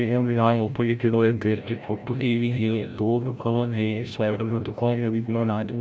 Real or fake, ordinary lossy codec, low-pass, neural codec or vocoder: fake; none; none; codec, 16 kHz, 0.5 kbps, FreqCodec, larger model